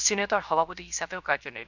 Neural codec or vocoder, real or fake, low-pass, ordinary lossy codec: codec, 16 kHz, 0.7 kbps, FocalCodec; fake; 7.2 kHz; none